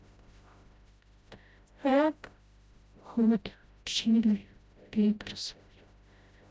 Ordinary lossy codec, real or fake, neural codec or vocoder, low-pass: none; fake; codec, 16 kHz, 0.5 kbps, FreqCodec, smaller model; none